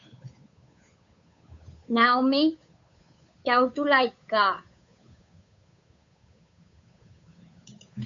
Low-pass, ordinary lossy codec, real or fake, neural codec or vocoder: 7.2 kHz; MP3, 64 kbps; fake; codec, 16 kHz, 8 kbps, FunCodec, trained on Chinese and English, 25 frames a second